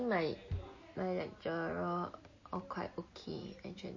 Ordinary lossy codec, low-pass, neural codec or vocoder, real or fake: MP3, 32 kbps; 7.2 kHz; none; real